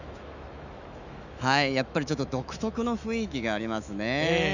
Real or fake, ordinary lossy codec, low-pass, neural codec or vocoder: fake; none; 7.2 kHz; autoencoder, 48 kHz, 128 numbers a frame, DAC-VAE, trained on Japanese speech